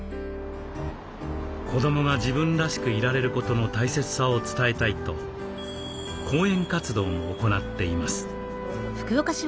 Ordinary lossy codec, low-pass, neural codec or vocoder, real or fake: none; none; none; real